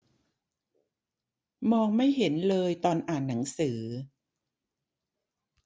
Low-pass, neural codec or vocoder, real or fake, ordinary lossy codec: none; none; real; none